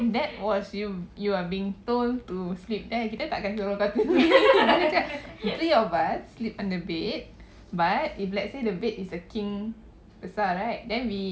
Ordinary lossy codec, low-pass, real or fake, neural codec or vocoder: none; none; real; none